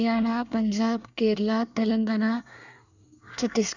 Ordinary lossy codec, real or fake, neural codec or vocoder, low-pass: none; fake; codec, 24 kHz, 1 kbps, SNAC; 7.2 kHz